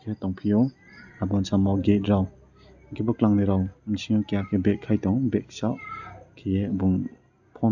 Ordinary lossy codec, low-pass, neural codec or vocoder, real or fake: none; 7.2 kHz; none; real